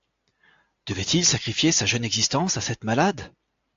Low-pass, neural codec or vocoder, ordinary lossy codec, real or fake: 7.2 kHz; none; AAC, 64 kbps; real